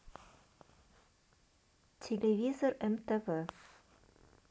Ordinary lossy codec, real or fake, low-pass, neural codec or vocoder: none; real; none; none